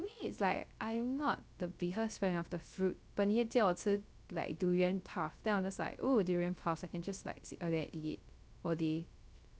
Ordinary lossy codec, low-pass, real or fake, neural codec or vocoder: none; none; fake; codec, 16 kHz, 0.3 kbps, FocalCodec